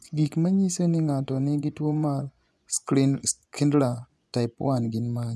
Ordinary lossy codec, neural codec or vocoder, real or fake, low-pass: none; vocoder, 24 kHz, 100 mel bands, Vocos; fake; none